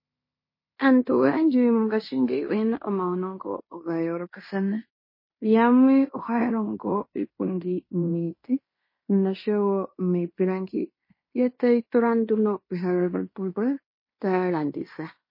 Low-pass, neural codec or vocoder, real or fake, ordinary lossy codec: 5.4 kHz; codec, 16 kHz in and 24 kHz out, 0.9 kbps, LongCat-Audio-Codec, fine tuned four codebook decoder; fake; MP3, 24 kbps